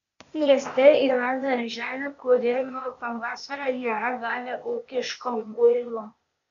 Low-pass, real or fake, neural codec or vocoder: 7.2 kHz; fake; codec, 16 kHz, 0.8 kbps, ZipCodec